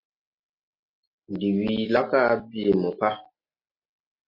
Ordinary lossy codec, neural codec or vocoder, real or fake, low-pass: MP3, 32 kbps; none; real; 5.4 kHz